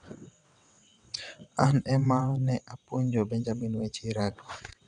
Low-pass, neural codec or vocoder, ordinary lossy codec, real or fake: 9.9 kHz; vocoder, 22.05 kHz, 80 mel bands, WaveNeXt; none; fake